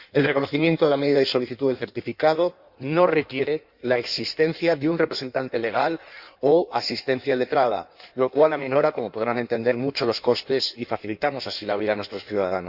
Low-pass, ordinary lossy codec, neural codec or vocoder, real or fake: 5.4 kHz; Opus, 64 kbps; codec, 16 kHz in and 24 kHz out, 1.1 kbps, FireRedTTS-2 codec; fake